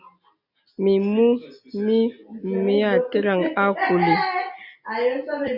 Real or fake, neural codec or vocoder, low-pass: real; none; 5.4 kHz